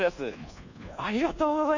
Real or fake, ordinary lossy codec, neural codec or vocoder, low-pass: fake; none; codec, 24 kHz, 1.2 kbps, DualCodec; 7.2 kHz